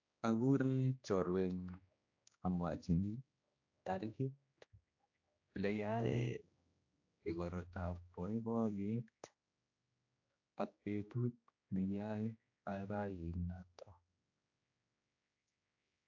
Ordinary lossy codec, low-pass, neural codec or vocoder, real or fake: AAC, 48 kbps; 7.2 kHz; codec, 16 kHz, 1 kbps, X-Codec, HuBERT features, trained on general audio; fake